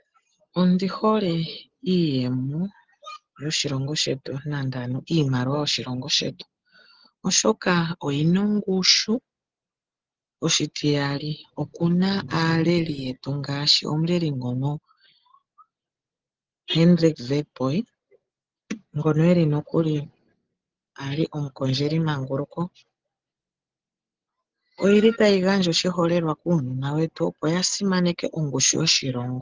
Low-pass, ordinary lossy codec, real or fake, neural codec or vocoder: 7.2 kHz; Opus, 16 kbps; real; none